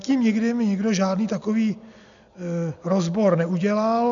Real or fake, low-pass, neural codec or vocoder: real; 7.2 kHz; none